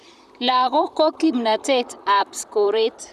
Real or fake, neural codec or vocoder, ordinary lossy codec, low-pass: fake; vocoder, 44.1 kHz, 128 mel bands, Pupu-Vocoder; none; 14.4 kHz